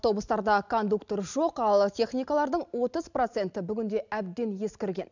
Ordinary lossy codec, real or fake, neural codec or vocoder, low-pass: none; real; none; 7.2 kHz